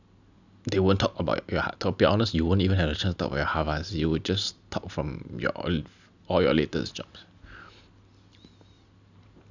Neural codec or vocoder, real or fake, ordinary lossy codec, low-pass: none; real; none; 7.2 kHz